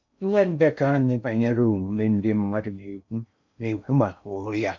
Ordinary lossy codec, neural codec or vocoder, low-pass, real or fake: MP3, 64 kbps; codec, 16 kHz in and 24 kHz out, 0.6 kbps, FocalCodec, streaming, 2048 codes; 7.2 kHz; fake